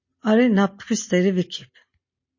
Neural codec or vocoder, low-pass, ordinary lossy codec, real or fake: none; 7.2 kHz; MP3, 32 kbps; real